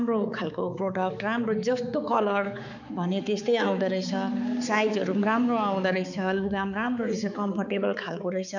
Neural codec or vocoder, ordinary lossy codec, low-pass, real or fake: codec, 16 kHz, 4 kbps, X-Codec, HuBERT features, trained on balanced general audio; none; 7.2 kHz; fake